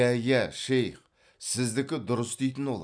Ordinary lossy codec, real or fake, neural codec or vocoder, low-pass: none; real; none; 9.9 kHz